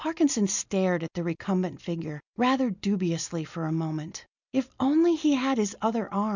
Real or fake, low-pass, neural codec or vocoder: real; 7.2 kHz; none